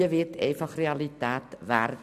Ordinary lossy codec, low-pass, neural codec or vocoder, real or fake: MP3, 96 kbps; 14.4 kHz; none; real